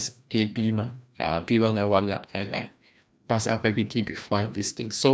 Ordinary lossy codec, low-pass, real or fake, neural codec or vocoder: none; none; fake; codec, 16 kHz, 1 kbps, FreqCodec, larger model